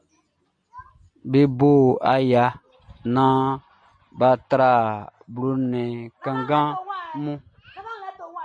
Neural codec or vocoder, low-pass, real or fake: none; 9.9 kHz; real